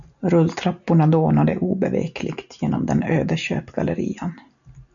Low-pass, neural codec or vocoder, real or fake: 7.2 kHz; none; real